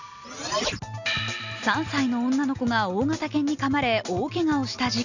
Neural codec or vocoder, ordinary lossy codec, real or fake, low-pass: none; none; real; 7.2 kHz